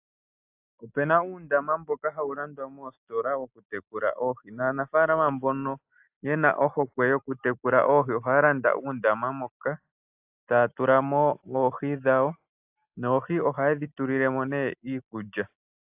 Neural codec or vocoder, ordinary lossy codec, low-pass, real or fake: none; AAC, 32 kbps; 3.6 kHz; real